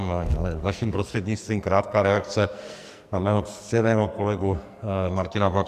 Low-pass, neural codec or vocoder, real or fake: 14.4 kHz; codec, 44.1 kHz, 2.6 kbps, SNAC; fake